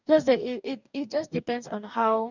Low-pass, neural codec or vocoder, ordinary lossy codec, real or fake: 7.2 kHz; codec, 44.1 kHz, 2.6 kbps, DAC; none; fake